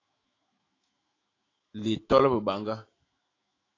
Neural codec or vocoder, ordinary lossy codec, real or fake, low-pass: autoencoder, 48 kHz, 128 numbers a frame, DAC-VAE, trained on Japanese speech; AAC, 32 kbps; fake; 7.2 kHz